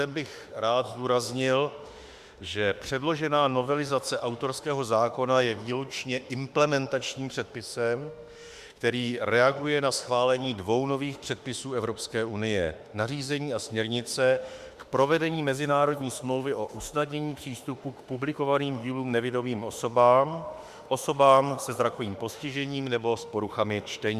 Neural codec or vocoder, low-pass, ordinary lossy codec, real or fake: autoencoder, 48 kHz, 32 numbers a frame, DAC-VAE, trained on Japanese speech; 14.4 kHz; Opus, 64 kbps; fake